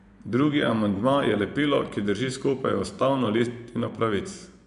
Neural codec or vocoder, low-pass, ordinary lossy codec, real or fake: vocoder, 24 kHz, 100 mel bands, Vocos; 10.8 kHz; none; fake